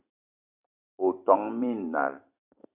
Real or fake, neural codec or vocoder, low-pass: fake; vocoder, 44.1 kHz, 128 mel bands every 256 samples, BigVGAN v2; 3.6 kHz